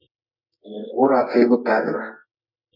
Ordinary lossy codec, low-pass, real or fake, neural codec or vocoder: MP3, 48 kbps; 5.4 kHz; fake; codec, 24 kHz, 0.9 kbps, WavTokenizer, medium music audio release